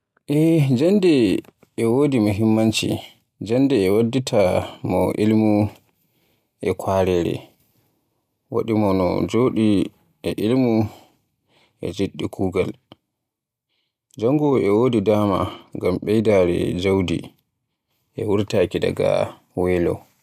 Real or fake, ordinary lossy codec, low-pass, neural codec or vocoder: real; none; 14.4 kHz; none